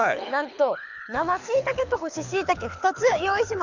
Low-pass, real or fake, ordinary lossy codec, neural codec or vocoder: 7.2 kHz; fake; none; codec, 24 kHz, 6 kbps, HILCodec